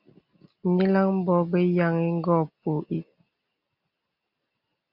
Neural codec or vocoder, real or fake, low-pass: none; real; 5.4 kHz